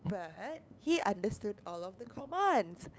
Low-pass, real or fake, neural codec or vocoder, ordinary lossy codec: none; fake; codec, 16 kHz, 8 kbps, FunCodec, trained on LibriTTS, 25 frames a second; none